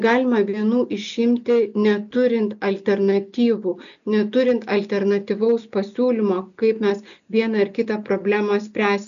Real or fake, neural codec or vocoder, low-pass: real; none; 7.2 kHz